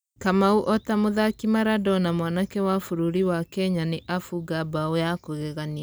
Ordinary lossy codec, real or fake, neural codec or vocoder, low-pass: none; real; none; none